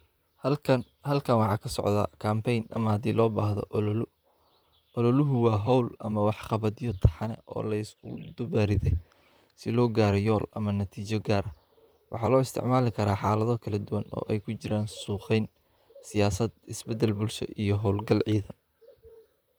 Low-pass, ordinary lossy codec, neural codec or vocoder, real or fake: none; none; vocoder, 44.1 kHz, 128 mel bands, Pupu-Vocoder; fake